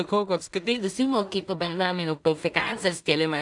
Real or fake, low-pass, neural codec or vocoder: fake; 10.8 kHz; codec, 16 kHz in and 24 kHz out, 0.4 kbps, LongCat-Audio-Codec, two codebook decoder